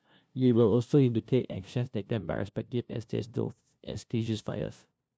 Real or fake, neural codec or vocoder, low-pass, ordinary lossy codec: fake; codec, 16 kHz, 0.5 kbps, FunCodec, trained on LibriTTS, 25 frames a second; none; none